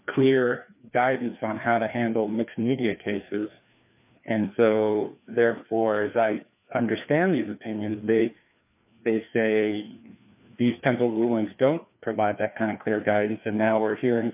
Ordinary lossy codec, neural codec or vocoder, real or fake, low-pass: AAC, 32 kbps; codec, 16 kHz, 2 kbps, FreqCodec, larger model; fake; 3.6 kHz